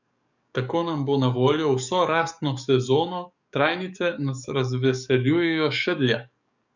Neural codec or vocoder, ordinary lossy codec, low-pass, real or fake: codec, 44.1 kHz, 7.8 kbps, DAC; none; 7.2 kHz; fake